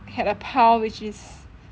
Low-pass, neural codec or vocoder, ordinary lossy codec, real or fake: none; none; none; real